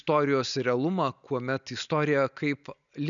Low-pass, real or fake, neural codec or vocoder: 7.2 kHz; real; none